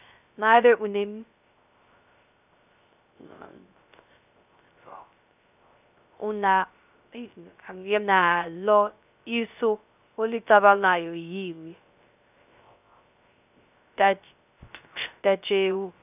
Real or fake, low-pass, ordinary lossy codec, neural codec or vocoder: fake; 3.6 kHz; none; codec, 16 kHz, 0.3 kbps, FocalCodec